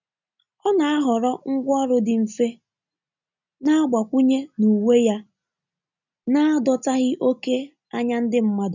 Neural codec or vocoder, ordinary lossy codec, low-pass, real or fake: none; none; 7.2 kHz; real